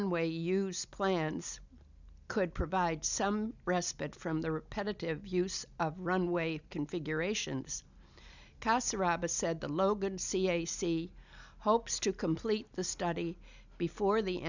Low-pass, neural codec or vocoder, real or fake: 7.2 kHz; none; real